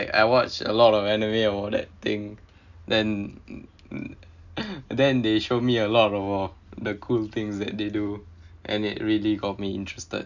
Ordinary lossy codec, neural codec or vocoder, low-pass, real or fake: none; none; 7.2 kHz; real